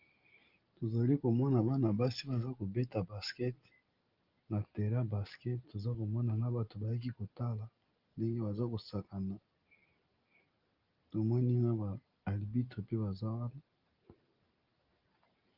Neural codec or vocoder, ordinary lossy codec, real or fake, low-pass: none; Opus, 32 kbps; real; 5.4 kHz